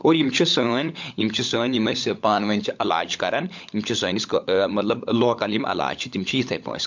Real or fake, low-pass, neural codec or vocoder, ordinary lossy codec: fake; 7.2 kHz; codec, 16 kHz, 16 kbps, FunCodec, trained on LibriTTS, 50 frames a second; MP3, 64 kbps